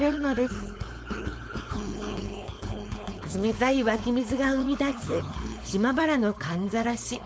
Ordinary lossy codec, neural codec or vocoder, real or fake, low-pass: none; codec, 16 kHz, 4.8 kbps, FACodec; fake; none